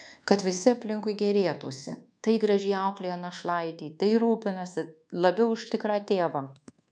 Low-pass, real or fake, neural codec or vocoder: 9.9 kHz; fake; codec, 24 kHz, 1.2 kbps, DualCodec